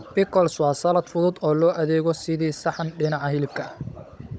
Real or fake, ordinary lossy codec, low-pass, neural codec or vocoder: fake; none; none; codec, 16 kHz, 16 kbps, FunCodec, trained on Chinese and English, 50 frames a second